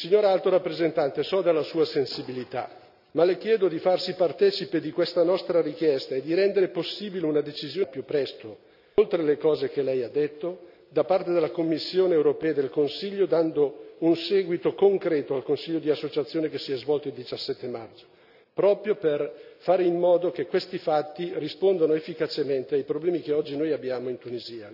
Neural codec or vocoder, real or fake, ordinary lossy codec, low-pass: none; real; none; 5.4 kHz